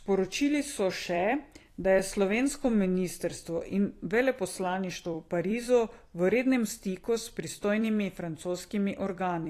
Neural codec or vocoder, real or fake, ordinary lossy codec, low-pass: vocoder, 44.1 kHz, 128 mel bands every 256 samples, BigVGAN v2; fake; AAC, 48 kbps; 14.4 kHz